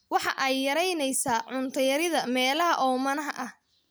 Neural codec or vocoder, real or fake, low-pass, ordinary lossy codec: none; real; none; none